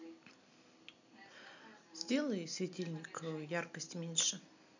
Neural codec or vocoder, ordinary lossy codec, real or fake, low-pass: none; none; real; 7.2 kHz